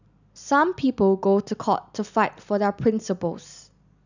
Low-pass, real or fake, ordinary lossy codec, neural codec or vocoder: 7.2 kHz; real; none; none